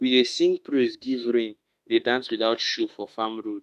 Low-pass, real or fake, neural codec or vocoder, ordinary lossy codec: 14.4 kHz; fake; autoencoder, 48 kHz, 32 numbers a frame, DAC-VAE, trained on Japanese speech; none